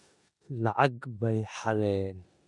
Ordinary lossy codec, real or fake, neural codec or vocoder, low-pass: none; fake; codec, 16 kHz in and 24 kHz out, 0.9 kbps, LongCat-Audio-Codec, four codebook decoder; 10.8 kHz